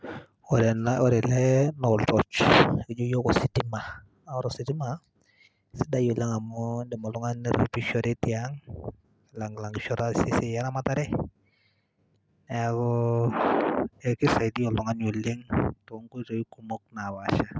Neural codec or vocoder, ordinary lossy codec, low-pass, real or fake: none; none; none; real